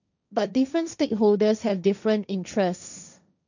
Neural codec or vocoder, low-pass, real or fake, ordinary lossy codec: codec, 16 kHz, 1.1 kbps, Voila-Tokenizer; 7.2 kHz; fake; none